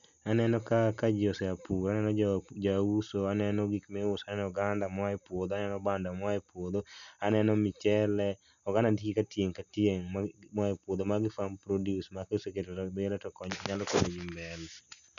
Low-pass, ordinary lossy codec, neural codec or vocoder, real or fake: 7.2 kHz; none; none; real